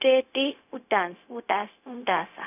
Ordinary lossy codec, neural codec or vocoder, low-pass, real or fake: none; codec, 16 kHz, 0.4 kbps, LongCat-Audio-Codec; 3.6 kHz; fake